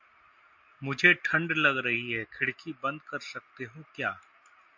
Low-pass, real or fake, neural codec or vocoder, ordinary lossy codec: 7.2 kHz; real; none; MP3, 48 kbps